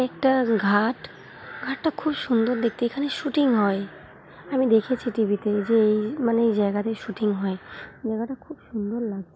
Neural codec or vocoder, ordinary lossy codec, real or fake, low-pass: none; none; real; none